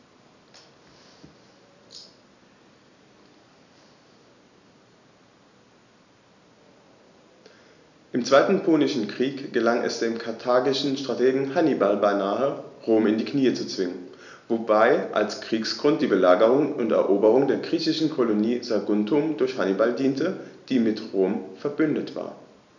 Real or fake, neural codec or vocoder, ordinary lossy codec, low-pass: real; none; none; 7.2 kHz